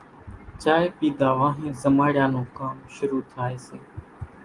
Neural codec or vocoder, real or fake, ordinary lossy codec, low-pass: none; real; Opus, 24 kbps; 10.8 kHz